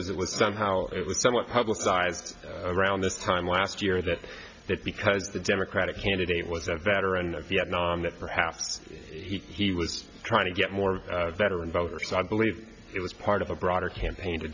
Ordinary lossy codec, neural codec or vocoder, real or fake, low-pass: MP3, 64 kbps; none; real; 7.2 kHz